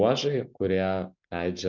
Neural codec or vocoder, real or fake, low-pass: none; real; 7.2 kHz